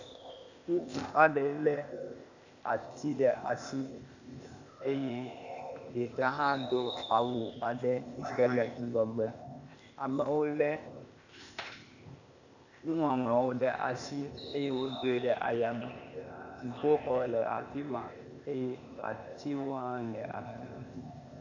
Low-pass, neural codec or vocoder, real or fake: 7.2 kHz; codec, 16 kHz, 0.8 kbps, ZipCodec; fake